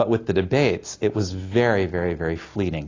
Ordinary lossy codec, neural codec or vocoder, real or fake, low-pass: AAC, 32 kbps; none; real; 7.2 kHz